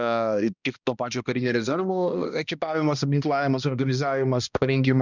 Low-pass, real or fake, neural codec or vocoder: 7.2 kHz; fake; codec, 16 kHz, 1 kbps, X-Codec, HuBERT features, trained on balanced general audio